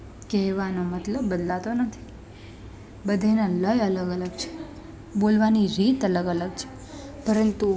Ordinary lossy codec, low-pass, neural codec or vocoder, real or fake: none; none; none; real